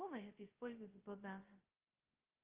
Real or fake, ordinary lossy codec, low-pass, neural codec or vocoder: fake; Opus, 32 kbps; 3.6 kHz; codec, 16 kHz, 0.2 kbps, FocalCodec